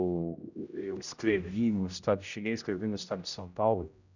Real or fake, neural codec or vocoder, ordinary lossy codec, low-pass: fake; codec, 16 kHz, 0.5 kbps, X-Codec, HuBERT features, trained on general audio; none; 7.2 kHz